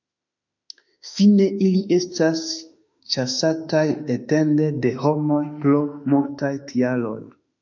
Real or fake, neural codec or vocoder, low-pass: fake; autoencoder, 48 kHz, 32 numbers a frame, DAC-VAE, trained on Japanese speech; 7.2 kHz